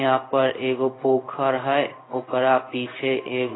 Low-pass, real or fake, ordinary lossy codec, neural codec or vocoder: 7.2 kHz; fake; AAC, 16 kbps; codec, 16 kHz in and 24 kHz out, 1 kbps, XY-Tokenizer